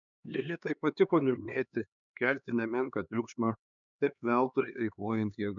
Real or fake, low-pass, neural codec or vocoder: fake; 7.2 kHz; codec, 16 kHz, 2 kbps, X-Codec, HuBERT features, trained on LibriSpeech